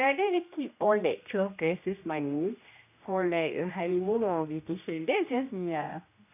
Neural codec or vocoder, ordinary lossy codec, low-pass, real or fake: codec, 16 kHz, 1 kbps, X-Codec, HuBERT features, trained on general audio; none; 3.6 kHz; fake